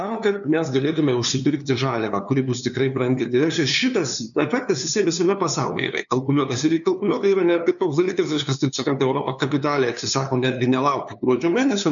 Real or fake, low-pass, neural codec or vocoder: fake; 7.2 kHz; codec, 16 kHz, 2 kbps, FunCodec, trained on LibriTTS, 25 frames a second